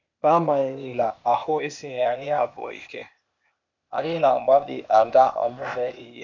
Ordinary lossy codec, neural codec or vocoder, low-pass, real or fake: none; codec, 16 kHz, 0.8 kbps, ZipCodec; 7.2 kHz; fake